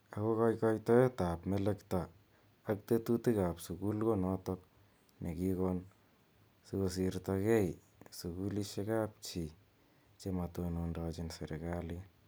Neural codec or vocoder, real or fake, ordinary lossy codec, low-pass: none; real; none; none